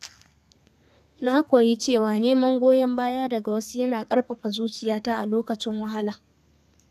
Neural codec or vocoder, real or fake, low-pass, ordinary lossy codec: codec, 32 kHz, 1.9 kbps, SNAC; fake; 14.4 kHz; none